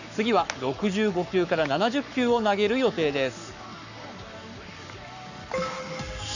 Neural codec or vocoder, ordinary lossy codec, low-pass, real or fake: codec, 44.1 kHz, 7.8 kbps, Pupu-Codec; none; 7.2 kHz; fake